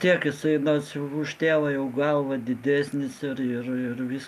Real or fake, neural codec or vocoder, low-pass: real; none; 14.4 kHz